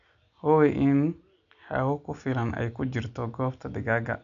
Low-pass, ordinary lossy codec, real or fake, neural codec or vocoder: 7.2 kHz; none; real; none